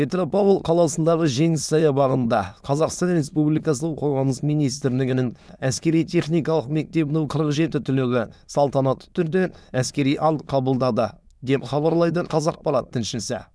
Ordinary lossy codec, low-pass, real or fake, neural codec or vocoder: none; none; fake; autoencoder, 22.05 kHz, a latent of 192 numbers a frame, VITS, trained on many speakers